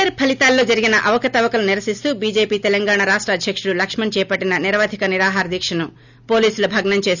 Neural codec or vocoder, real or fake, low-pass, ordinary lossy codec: none; real; 7.2 kHz; none